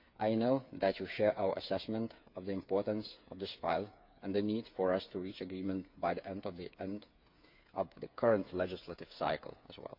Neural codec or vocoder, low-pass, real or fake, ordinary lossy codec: codec, 16 kHz, 8 kbps, FreqCodec, smaller model; 5.4 kHz; fake; MP3, 48 kbps